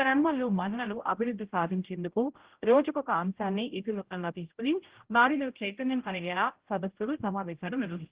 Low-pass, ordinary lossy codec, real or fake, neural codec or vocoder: 3.6 kHz; Opus, 16 kbps; fake; codec, 16 kHz, 0.5 kbps, X-Codec, HuBERT features, trained on general audio